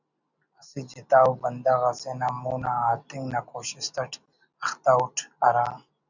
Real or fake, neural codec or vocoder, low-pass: real; none; 7.2 kHz